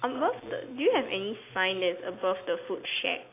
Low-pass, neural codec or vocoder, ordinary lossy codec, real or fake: 3.6 kHz; none; AAC, 24 kbps; real